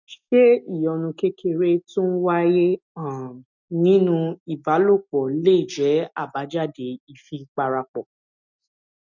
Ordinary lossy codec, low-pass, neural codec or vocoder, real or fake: none; 7.2 kHz; none; real